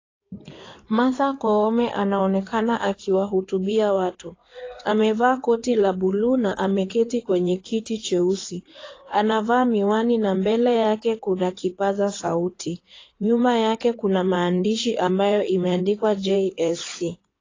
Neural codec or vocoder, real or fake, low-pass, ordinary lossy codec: codec, 16 kHz in and 24 kHz out, 2.2 kbps, FireRedTTS-2 codec; fake; 7.2 kHz; AAC, 32 kbps